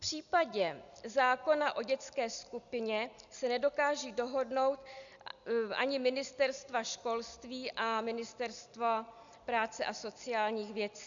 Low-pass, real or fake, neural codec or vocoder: 7.2 kHz; real; none